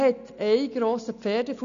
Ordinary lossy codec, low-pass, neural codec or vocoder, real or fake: MP3, 96 kbps; 7.2 kHz; none; real